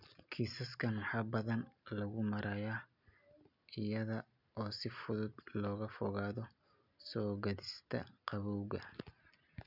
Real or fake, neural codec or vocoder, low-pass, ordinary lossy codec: real; none; 5.4 kHz; none